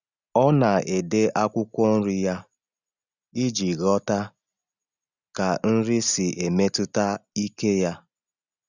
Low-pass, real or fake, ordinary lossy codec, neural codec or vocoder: 7.2 kHz; real; none; none